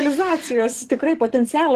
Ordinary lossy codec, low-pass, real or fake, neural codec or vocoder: Opus, 16 kbps; 14.4 kHz; fake; codec, 44.1 kHz, 7.8 kbps, DAC